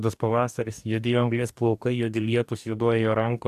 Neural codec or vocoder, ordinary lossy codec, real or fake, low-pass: codec, 44.1 kHz, 2.6 kbps, DAC; AAC, 96 kbps; fake; 14.4 kHz